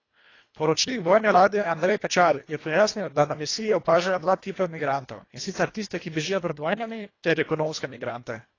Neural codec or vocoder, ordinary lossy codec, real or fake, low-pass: codec, 24 kHz, 1.5 kbps, HILCodec; AAC, 32 kbps; fake; 7.2 kHz